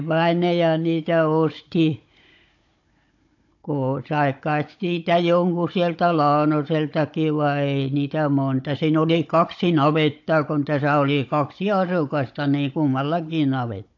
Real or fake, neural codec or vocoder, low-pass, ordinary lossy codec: fake; codec, 16 kHz, 16 kbps, FunCodec, trained on Chinese and English, 50 frames a second; 7.2 kHz; AAC, 48 kbps